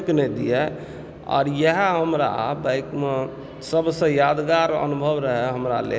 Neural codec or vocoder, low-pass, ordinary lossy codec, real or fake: none; none; none; real